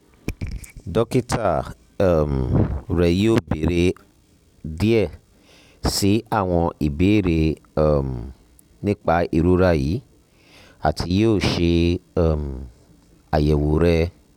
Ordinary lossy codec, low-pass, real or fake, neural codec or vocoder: none; 19.8 kHz; real; none